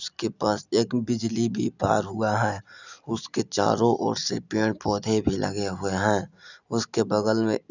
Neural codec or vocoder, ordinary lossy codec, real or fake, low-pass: none; none; real; 7.2 kHz